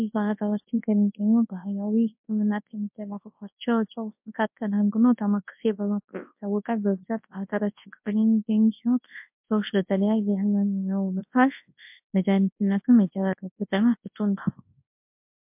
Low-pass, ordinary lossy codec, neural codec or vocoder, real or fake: 3.6 kHz; MP3, 32 kbps; codec, 24 kHz, 0.9 kbps, WavTokenizer, large speech release; fake